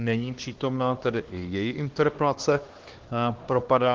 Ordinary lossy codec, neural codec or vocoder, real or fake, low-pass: Opus, 16 kbps; codec, 16 kHz, 2 kbps, X-Codec, HuBERT features, trained on LibriSpeech; fake; 7.2 kHz